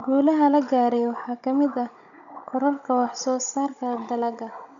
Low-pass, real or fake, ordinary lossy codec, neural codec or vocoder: 7.2 kHz; fake; none; codec, 16 kHz, 16 kbps, FunCodec, trained on Chinese and English, 50 frames a second